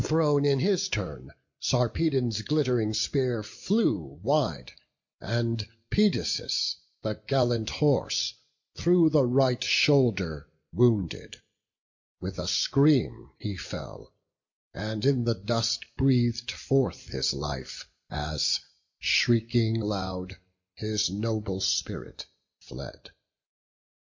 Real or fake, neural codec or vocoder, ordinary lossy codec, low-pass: real; none; MP3, 48 kbps; 7.2 kHz